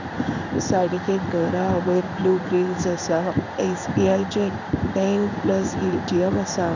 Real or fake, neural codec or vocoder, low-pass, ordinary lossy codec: fake; codec, 16 kHz in and 24 kHz out, 1 kbps, XY-Tokenizer; 7.2 kHz; none